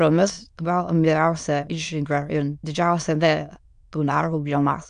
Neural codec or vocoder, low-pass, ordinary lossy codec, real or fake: autoencoder, 22.05 kHz, a latent of 192 numbers a frame, VITS, trained on many speakers; 9.9 kHz; MP3, 64 kbps; fake